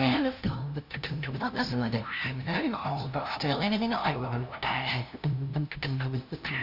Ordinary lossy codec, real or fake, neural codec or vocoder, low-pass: Opus, 64 kbps; fake; codec, 16 kHz, 0.5 kbps, FunCodec, trained on LibriTTS, 25 frames a second; 5.4 kHz